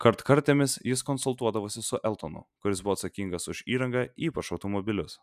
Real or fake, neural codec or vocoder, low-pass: real; none; 14.4 kHz